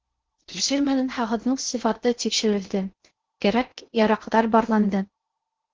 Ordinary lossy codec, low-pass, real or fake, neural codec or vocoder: Opus, 16 kbps; 7.2 kHz; fake; codec, 16 kHz in and 24 kHz out, 0.6 kbps, FocalCodec, streaming, 2048 codes